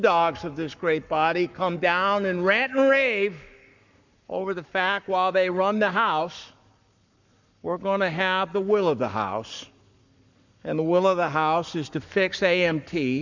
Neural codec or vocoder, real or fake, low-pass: codec, 44.1 kHz, 7.8 kbps, Pupu-Codec; fake; 7.2 kHz